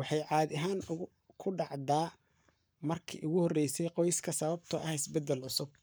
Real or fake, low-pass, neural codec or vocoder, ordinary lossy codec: fake; none; codec, 44.1 kHz, 7.8 kbps, Pupu-Codec; none